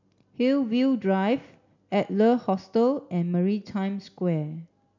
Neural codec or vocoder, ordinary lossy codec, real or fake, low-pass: none; MP3, 64 kbps; real; 7.2 kHz